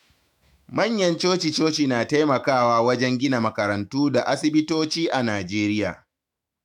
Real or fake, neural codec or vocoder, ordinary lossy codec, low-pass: fake; autoencoder, 48 kHz, 128 numbers a frame, DAC-VAE, trained on Japanese speech; none; 19.8 kHz